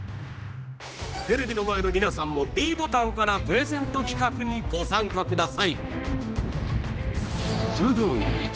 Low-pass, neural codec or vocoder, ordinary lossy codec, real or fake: none; codec, 16 kHz, 1 kbps, X-Codec, HuBERT features, trained on general audio; none; fake